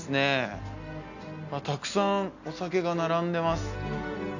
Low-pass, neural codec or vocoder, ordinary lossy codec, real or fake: 7.2 kHz; none; none; real